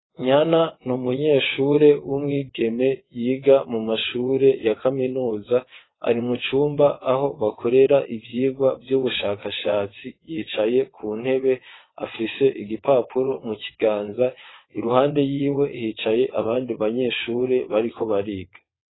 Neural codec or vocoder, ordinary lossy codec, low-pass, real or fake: vocoder, 22.05 kHz, 80 mel bands, WaveNeXt; AAC, 16 kbps; 7.2 kHz; fake